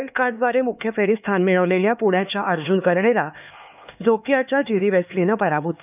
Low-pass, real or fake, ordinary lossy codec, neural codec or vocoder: 3.6 kHz; fake; none; codec, 16 kHz, 4 kbps, X-Codec, HuBERT features, trained on LibriSpeech